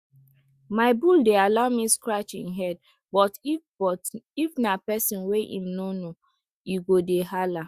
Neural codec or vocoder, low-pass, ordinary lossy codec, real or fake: autoencoder, 48 kHz, 128 numbers a frame, DAC-VAE, trained on Japanese speech; 14.4 kHz; Opus, 64 kbps; fake